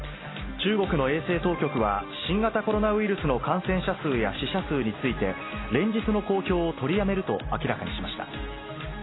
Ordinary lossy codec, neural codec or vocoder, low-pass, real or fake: AAC, 16 kbps; none; 7.2 kHz; real